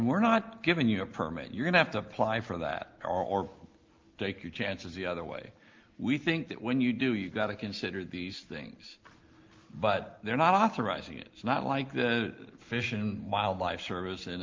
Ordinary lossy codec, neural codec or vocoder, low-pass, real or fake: Opus, 24 kbps; none; 7.2 kHz; real